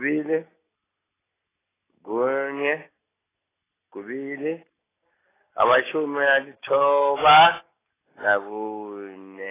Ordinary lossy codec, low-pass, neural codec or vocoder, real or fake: AAC, 16 kbps; 3.6 kHz; none; real